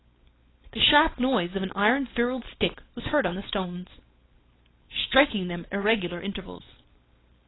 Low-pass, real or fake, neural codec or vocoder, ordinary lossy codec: 7.2 kHz; fake; codec, 16 kHz, 4.8 kbps, FACodec; AAC, 16 kbps